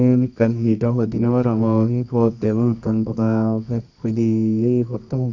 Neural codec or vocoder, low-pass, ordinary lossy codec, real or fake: codec, 24 kHz, 0.9 kbps, WavTokenizer, medium music audio release; 7.2 kHz; none; fake